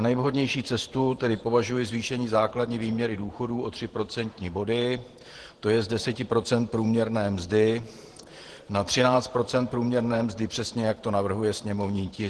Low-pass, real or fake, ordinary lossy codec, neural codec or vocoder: 10.8 kHz; fake; Opus, 16 kbps; vocoder, 48 kHz, 128 mel bands, Vocos